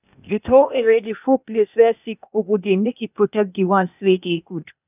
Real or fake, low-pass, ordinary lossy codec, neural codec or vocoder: fake; 3.6 kHz; none; codec, 16 kHz, 0.8 kbps, ZipCodec